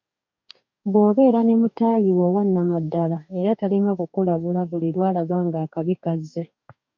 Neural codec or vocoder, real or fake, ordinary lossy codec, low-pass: codec, 44.1 kHz, 2.6 kbps, DAC; fake; MP3, 64 kbps; 7.2 kHz